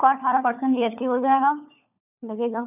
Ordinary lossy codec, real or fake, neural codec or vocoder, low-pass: none; fake; codec, 16 kHz, 4 kbps, FunCodec, trained on LibriTTS, 50 frames a second; 3.6 kHz